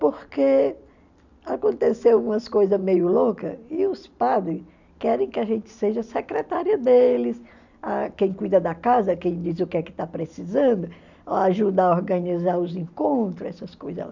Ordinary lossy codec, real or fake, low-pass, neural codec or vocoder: none; real; 7.2 kHz; none